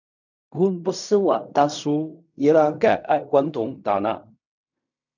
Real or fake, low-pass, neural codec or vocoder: fake; 7.2 kHz; codec, 16 kHz in and 24 kHz out, 0.4 kbps, LongCat-Audio-Codec, fine tuned four codebook decoder